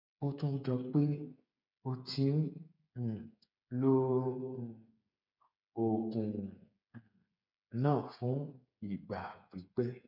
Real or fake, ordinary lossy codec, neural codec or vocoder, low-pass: fake; none; codec, 16 kHz, 8 kbps, FreqCodec, smaller model; 5.4 kHz